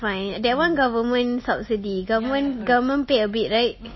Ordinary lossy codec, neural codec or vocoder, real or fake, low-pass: MP3, 24 kbps; none; real; 7.2 kHz